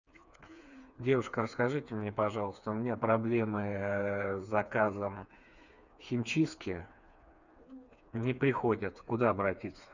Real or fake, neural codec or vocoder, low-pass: fake; codec, 16 kHz, 4 kbps, FreqCodec, smaller model; 7.2 kHz